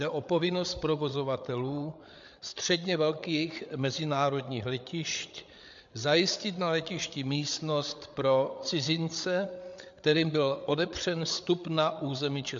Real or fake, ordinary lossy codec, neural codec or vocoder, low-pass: fake; MP3, 64 kbps; codec, 16 kHz, 8 kbps, FreqCodec, larger model; 7.2 kHz